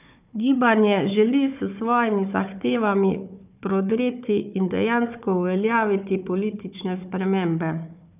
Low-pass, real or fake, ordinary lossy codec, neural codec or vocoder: 3.6 kHz; fake; none; codec, 16 kHz, 4 kbps, FunCodec, trained on Chinese and English, 50 frames a second